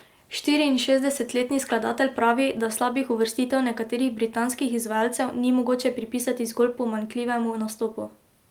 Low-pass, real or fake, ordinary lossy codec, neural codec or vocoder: 19.8 kHz; real; Opus, 32 kbps; none